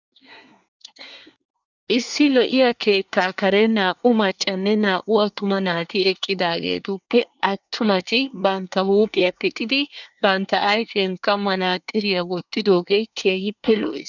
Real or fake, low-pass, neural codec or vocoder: fake; 7.2 kHz; codec, 24 kHz, 1 kbps, SNAC